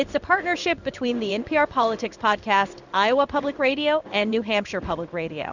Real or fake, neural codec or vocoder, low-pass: fake; codec, 16 kHz in and 24 kHz out, 1 kbps, XY-Tokenizer; 7.2 kHz